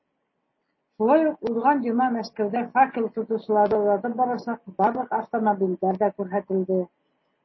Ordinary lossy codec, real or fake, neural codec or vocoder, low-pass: MP3, 24 kbps; real; none; 7.2 kHz